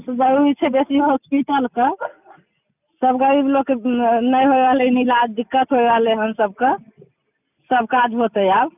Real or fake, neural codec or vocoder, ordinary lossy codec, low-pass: real; none; none; 3.6 kHz